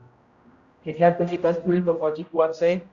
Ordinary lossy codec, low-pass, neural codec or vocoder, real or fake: Opus, 64 kbps; 7.2 kHz; codec, 16 kHz, 0.5 kbps, X-Codec, HuBERT features, trained on general audio; fake